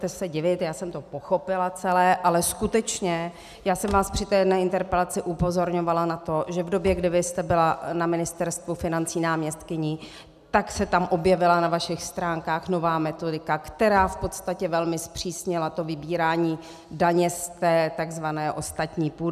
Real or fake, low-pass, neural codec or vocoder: real; 14.4 kHz; none